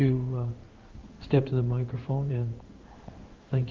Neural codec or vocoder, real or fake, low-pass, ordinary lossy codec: codec, 16 kHz in and 24 kHz out, 1 kbps, XY-Tokenizer; fake; 7.2 kHz; Opus, 24 kbps